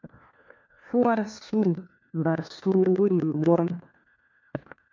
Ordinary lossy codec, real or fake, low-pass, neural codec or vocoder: MP3, 64 kbps; fake; 7.2 kHz; codec, 16 kHz, 1 kbps, FunCodec, trained on LibriTTS, 50 frames a second